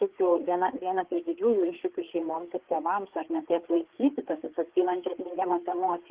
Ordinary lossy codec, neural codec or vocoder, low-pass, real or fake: Opus, 16 kbps; codec, 16 kHz, 4 kbps, FreqCodec, larger model; 3.6 kHz; fake